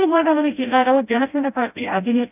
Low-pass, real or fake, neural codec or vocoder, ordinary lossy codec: 3.6 kHz; fake; codec, 16 kHz, 0.5 kbps, FreqCodec, smaller model; none